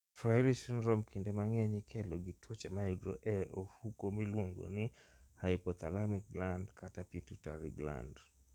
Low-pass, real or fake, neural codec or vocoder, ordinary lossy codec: 19.8 kHz; fake; codec, 44.1 kHz, 7.8 kbps, DAC; none